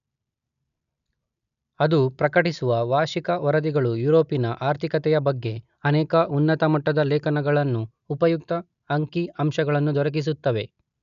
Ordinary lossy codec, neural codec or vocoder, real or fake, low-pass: none; none; real; 7.2 kHz